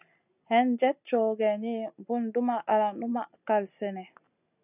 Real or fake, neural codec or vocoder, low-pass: real; none; 3.6 kHz